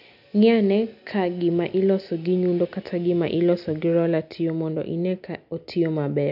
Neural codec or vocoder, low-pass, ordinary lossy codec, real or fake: none; 5.4 kHz; none; real